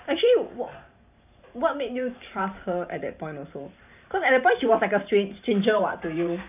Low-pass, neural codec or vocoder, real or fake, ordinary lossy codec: 3.6 kHz; vocoder, 44.1 kHz, 128 mel bands every 512 samples, BigVGAN v2; fake; none